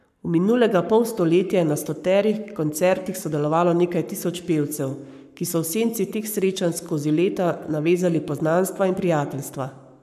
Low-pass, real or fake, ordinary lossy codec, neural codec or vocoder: 14.4 kHz; fake; none; codec, 44.1 kHz, 7.8 kbps, Pupu-Codec